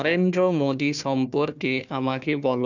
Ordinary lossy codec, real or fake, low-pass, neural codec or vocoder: none; fake; 7.2 kHz; codec, 16 kHz, 1 kbps, FunCodec, trained on Chinese and English, 50 frames a second